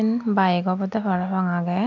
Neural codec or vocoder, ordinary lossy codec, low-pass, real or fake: none; none; 7.2 kHz; real